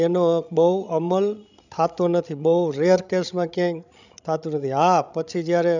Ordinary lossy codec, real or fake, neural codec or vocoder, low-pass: none; fake; codec, 16 kHz, 16 kbps, FreqCodec, larger model; 7.2 kHz